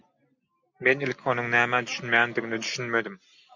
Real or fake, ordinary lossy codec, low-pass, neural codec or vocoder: real; MP3, 64 kbps; 7.2 kHz; none